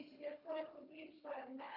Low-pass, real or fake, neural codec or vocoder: 5.4 kHz; fake; codec, 24 kHz, 3 kbps, HILCodec